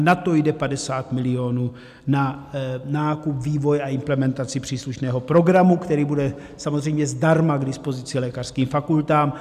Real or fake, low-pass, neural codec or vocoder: real; 14.4 kHz; none